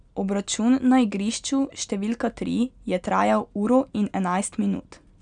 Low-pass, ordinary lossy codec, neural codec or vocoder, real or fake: 9.9 kHz; none; none; real